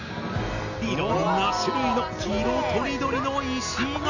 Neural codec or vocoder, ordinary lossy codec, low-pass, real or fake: none; none; 7.2 kHz; real